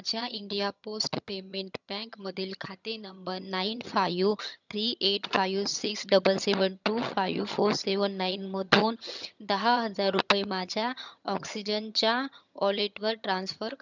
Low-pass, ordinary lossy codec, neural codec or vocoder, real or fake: 7.2 kHz; none; vocoder, 22.05 kHz, 80 mel bands, HiFi-GAN; fake